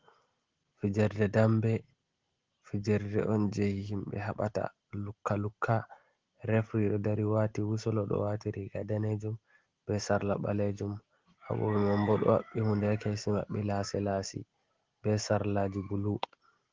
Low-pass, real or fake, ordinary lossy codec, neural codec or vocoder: 7.2 kHz; real; Opus, 16 kbps; none